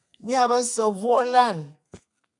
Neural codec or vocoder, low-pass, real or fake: codec, 32 kHz, 1.9 kbps, SNAC; 10.8 kHz; fake